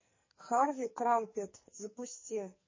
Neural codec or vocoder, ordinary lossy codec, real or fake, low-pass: codec, 44.1 kHz, 2.6 kbps, SNAC; MP3, 32 kbps; fake; 7.2 kHz